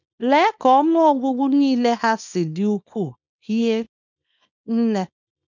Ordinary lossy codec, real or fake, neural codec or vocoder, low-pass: none; fake; codec, 24 kHz, 0.9 kbps, WavTokenizer, small release; 7.2 kHz